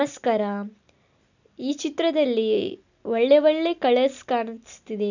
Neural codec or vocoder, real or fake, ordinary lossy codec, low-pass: none; real; none; 7.2 kHz